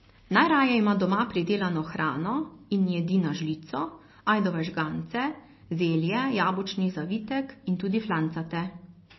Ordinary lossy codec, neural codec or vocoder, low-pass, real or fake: MP3, 24 kbps; none; 7.2 kHz; real